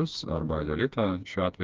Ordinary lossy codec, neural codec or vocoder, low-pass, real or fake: Opus, 32 kbps; codec, 16 kHz, 2 kbps, FreqCodec, smaller model; 7.2 kHz; fake